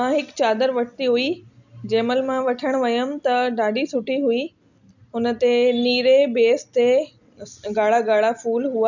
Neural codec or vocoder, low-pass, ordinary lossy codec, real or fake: none; 7.2 kHz; none; real